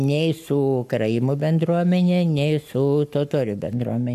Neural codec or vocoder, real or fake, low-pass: codec, 44.1 kHz, 7.8 kbps, Pupu-Codec; fake; 14.4 kHz